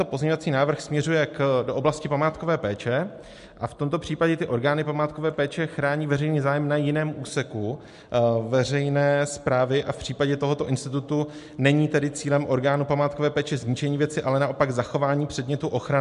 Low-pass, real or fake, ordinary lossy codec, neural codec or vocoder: 10.8 kHz; real; MP3, 64 kbps; none